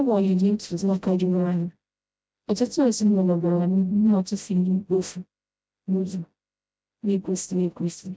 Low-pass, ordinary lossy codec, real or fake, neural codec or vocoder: none; none; fake; codec, 16 kHz, 0.5 kbps, FreqCodec, smaller model